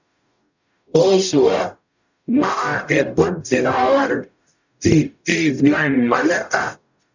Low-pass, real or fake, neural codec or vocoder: 7.2 kHz; fake; codec, 44.1 kHz, 0.9 kbps, DAC